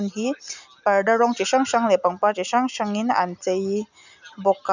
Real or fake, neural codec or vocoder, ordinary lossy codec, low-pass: real; none; none; 7.2 kHz